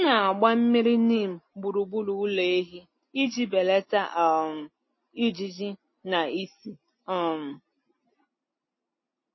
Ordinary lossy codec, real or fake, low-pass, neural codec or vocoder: MP3, 24 kbps; real; 7.2 kHz; none